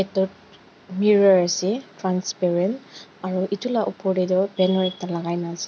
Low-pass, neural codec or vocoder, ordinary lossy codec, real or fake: none; none; none; real